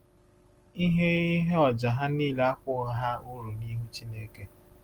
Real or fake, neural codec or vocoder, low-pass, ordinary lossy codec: real; none; 19.8 kHz; Opus, 24 kbps